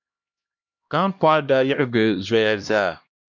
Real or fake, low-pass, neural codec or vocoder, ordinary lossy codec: fake; 7.2 kHz; codec, 16 kHz, 1 kbps, X-Codec, HuBERT features, trained on LibriSpeech; MP3, 64 kbps